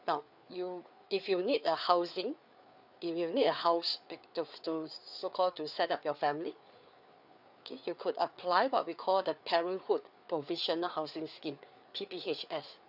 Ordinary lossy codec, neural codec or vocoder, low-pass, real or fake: none; codec, 16 kHz, 4 kbps, FreqCodec, larger model; 5.4 kHz; fake